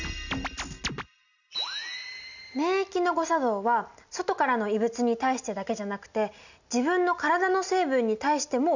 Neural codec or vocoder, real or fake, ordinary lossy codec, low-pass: none; real; none; 7.2 kHz